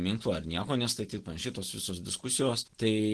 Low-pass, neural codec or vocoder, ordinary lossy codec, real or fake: 10.8 kHz; none; Opus, 16 kbps; real